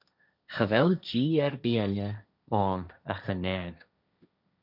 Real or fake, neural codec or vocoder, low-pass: fake; codec, 16 kHz, 1.1 kbps, Voila-Tokenizer; 5.4 kHz